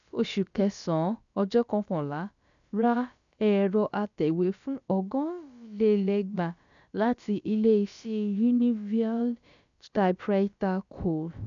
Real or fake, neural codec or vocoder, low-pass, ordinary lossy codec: fake; codec, 16 kHz, about 1 kbps, DyCAST, with the encoder's durations; 7.2 kHz; none